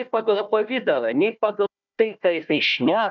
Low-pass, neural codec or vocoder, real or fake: 7.2 kHz; codec, 16 kHz, 1 kbps, FunCodec, trained on Chinese and English, 50 frames a second; fake